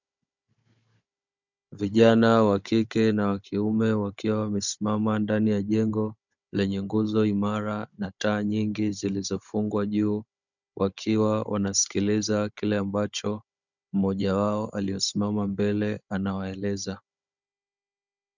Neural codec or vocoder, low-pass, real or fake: codec, 16 kHz, 16 kbps, FunCodec, trained on Chinese and English, 50 frames a second; 7.2 kHz; fake